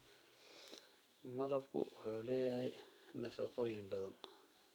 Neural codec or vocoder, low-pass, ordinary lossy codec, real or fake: codec, 44.1 kHz, 2.6 kbps, SNAC; none; none; fake